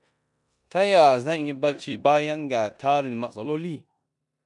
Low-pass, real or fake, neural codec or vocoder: 10.8 kHz; fake; codec, 16 kHz in and 24 kHz out, 0.9 kbps, LongCat-Audio-Codec, four codebook decoder